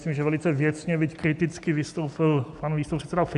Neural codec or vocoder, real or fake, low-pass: none; real; 10.8 kHz